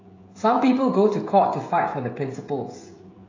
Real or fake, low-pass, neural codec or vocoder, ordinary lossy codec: fake; 7.2 kHz; codec, 16 kHz, 16 kbps, FreqCodec, smaller model; AAC, 32 kbps